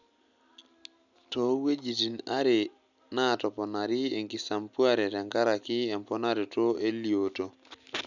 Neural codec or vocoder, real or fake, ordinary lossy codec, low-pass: none; real; none; 7.2 kHz